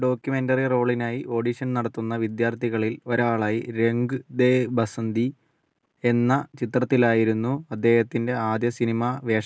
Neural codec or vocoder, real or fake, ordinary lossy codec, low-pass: none; real; none; none